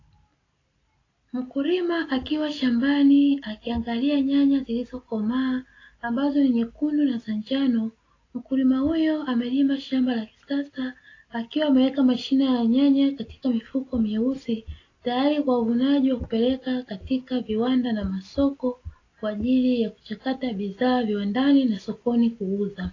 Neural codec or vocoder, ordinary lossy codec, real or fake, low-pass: none; AAC, 32 kbps; real; 7.2 kHz